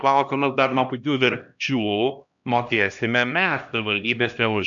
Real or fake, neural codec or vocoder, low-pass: fake; codec, 16 kHz, 1 kbps, X-Codec, HuBERT features, trained on LibriSpeech; 7.2 kHz